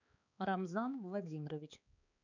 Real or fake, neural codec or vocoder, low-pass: fake; codec, 16 kHz, 4 kbps, X-Codec, HuBERT features, trained on balanced general audio; 7.2 kHz